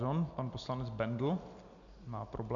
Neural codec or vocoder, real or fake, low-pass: none; real; 7.2 kHz